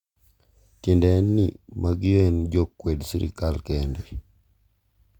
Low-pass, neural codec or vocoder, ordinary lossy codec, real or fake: 19.8 kHz; none; Opus, 64 kbps; real